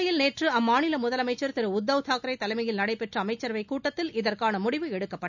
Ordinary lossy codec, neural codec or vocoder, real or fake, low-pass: none; none; real; 7.2 kHz